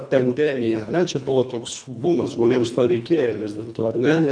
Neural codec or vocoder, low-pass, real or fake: codec, 24 kHz, 1.5 kbps, HILCodec; 9.9 kHz; fake